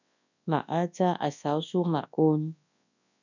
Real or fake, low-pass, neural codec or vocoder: fake; 7.2 kHz; codec, 24 kHz, 0.9 kbps, WavTokenizer, large speech release